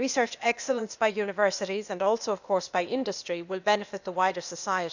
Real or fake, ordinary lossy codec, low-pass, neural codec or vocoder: fake; none; 7.2 kHz; codec, 16 kHz, 0.8 kbps, ZipCodec